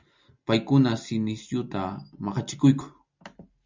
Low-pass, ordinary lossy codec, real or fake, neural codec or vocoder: 7.2 kHz; MP3, 64 kbps; real; none